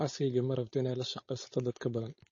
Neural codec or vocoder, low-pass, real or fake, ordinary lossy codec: codec, 16 kHz, 4.8 kbps, FACodec; 7.2 kHz; fake; MP3, 32 kbps